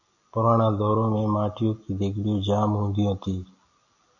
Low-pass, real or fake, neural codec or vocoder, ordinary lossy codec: 7.2 kHz; real; none; AAC, 48 kbps